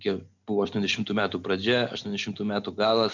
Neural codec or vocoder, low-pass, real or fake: none; 7.2 kHz; real